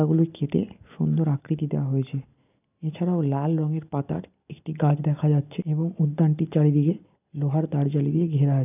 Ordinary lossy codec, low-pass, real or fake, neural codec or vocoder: none; 3.6 kHz; real; none